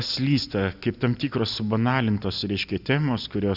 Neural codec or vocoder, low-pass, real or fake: none; 5.4 kHz; real